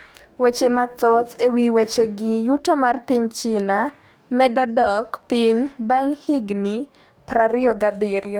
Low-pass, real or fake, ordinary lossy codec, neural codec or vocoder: none; fake; none; codec, 44.1 kHz, 2.6 kbps, DAC